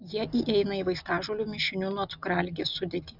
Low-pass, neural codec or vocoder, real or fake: 5.4 kHz; none; real